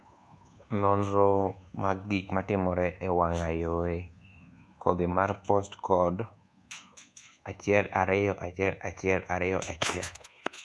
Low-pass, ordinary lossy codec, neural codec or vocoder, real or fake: none; none; codec, 24 kHz, 1.2 kbps, DualCodec; fake